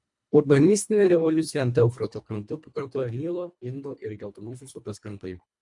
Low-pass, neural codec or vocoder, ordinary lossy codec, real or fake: 10.8 kHz; codec, 24 kHz, 1.5 kbps, HILCodec; MP3, 64 kbps; fake